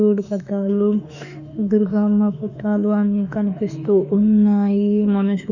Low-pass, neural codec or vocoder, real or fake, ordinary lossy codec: 7.2 kHz; autoencoder, 48 kHz, 32 numbers a frame, DAC-VAE, trained on Japanese speech; fake; none